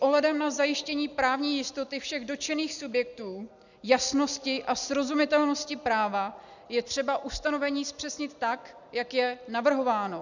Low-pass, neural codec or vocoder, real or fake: 7.2 kHz; none; real